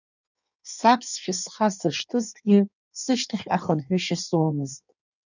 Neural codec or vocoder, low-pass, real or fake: codec, 16 kHz in and 24 kHz out, 1.1 kbps, FireRedTTS-2 codec; 7.2 kHz; fake